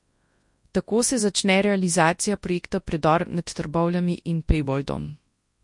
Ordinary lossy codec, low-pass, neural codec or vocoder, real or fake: MP3, 48 kbps; 10.8 kHz; codec, 24 kHz, 0.9 kbps, WavTokenizer, large speech release; fake